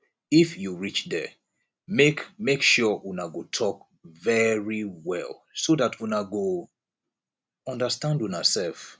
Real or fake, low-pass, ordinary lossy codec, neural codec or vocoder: real; none; none; none